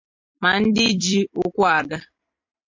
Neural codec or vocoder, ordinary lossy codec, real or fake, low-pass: vocoder, 44.1 kHz, 128 mel bands every 256 samples, BigVGAN v2; MP3, 48 kbps; fake; 7.2 kHz